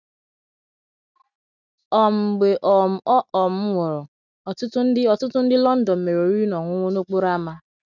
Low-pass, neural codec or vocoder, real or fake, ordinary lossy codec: 7.2 kHz; none; real; none